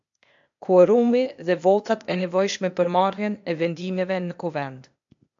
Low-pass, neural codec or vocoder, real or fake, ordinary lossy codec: 7.2 kHz; codec, 16 kHz, 0.8 kbps, ZipCodec; fake; AAC, 64 kbps